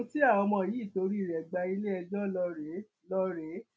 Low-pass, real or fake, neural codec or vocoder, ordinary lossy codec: none; real; none; none